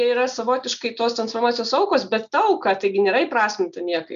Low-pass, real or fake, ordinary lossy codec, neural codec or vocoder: 7.2 kHz; real; AAC, 96 kbps; none